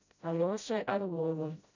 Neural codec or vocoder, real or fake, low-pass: codec, 16 kHz, 0.5 kbps, FreqCodec, smaller model; fake; 7.2 kHz